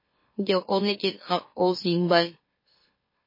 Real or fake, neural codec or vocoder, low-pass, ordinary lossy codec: fake; autoencoder, 44.1 kHz, a latent of 192 numbers a frame, MeloTTS; 5.4 kHz; MP3, 24 kbps